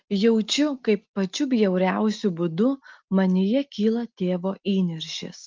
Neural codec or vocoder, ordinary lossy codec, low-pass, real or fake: none; Opus, 24 kbps; 7.2 kHz; real